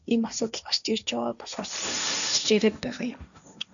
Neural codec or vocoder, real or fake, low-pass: codec, 16 kHz, 1.1 kbps, Voila-Tokenizer; fake; 7.2 kHz